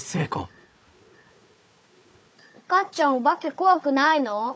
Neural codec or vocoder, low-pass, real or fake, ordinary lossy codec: codec, 16 kHz, 4 kbps, FunCodec, trained on Chinese and English, 50 frames a second; none; fake; none